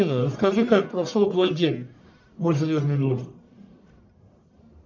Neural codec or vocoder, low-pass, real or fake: codec, 44.1 kHz, 1.7 kbps, Pupu-Codec; 7.2 kHz; fake